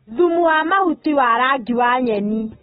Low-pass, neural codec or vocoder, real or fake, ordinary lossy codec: 19.8 kHz; none; real; AAC, 16 kbps